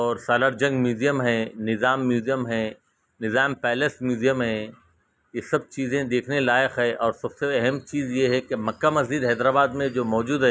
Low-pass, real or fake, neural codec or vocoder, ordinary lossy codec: none; real; none; none